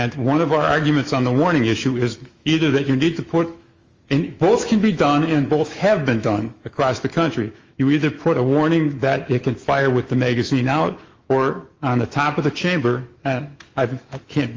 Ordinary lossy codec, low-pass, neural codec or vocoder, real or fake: Opus, 32 kbps; 7.2 kHz; autoencoder, 48 kHz, 128 numbers a frame, DAC-VAE, trained on Japanese speech; fake